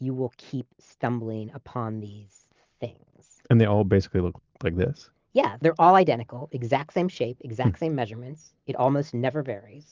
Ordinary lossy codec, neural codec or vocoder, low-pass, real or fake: Opus, 24 kbps; none; 7.2 kHz; real